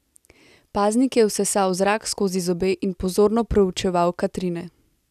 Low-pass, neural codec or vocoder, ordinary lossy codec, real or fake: 14.4 kHz; none; none; real